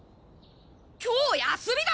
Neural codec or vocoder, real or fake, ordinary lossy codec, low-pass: none; real; none; none